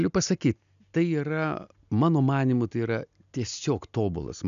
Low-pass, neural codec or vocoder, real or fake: 7.2 kHz; none; real